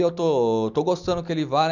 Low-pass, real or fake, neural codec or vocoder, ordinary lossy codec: 7.2 kHz; real; none; none